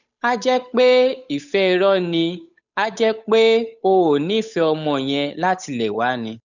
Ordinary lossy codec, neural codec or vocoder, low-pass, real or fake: none; codec, 16 kHz, 8 kbps, FunCodec, trained on Chinese and English, 25 frames a second; 7.2 kHz; fake